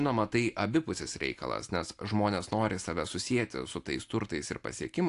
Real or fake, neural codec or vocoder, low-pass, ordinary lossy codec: real; none; 10.8 kHz; AAC, 64 kbps